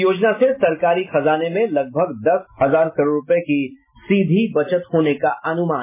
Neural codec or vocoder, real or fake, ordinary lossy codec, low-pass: none; real; MP3, 16 kbps; 3.6 kHz